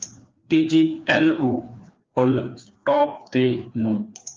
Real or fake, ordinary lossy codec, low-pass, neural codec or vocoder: fake; Opus, 32 kbps; 7.2 kHz; codec, 16 kHz, 2 kbps, FreqCodec, larger model